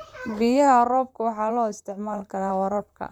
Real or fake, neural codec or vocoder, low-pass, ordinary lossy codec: fake; vocoder, 44.1 kHz, 128 mel bands, Pupu-Vocoder; 19.8 kHz; none